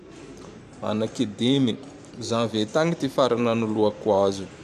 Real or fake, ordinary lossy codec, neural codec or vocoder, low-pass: fake; none; vocoder, 44.1 kHz, 128 mel bands every 512 samples, BigVGAN v2; 14.4 kHz